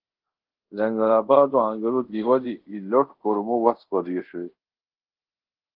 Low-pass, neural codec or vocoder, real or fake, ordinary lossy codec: 5.4 kHz; codec, 24 kHz, 0.5 kbps, DualCodec; fake; Opus, 16 kbps